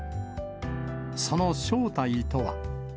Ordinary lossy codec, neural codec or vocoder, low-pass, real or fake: none; none; none; real